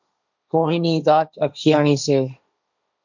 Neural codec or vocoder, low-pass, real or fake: codec, 16 kHz, 1.1 kbps, Voila-Tokenizer; 7.2 kHz; fake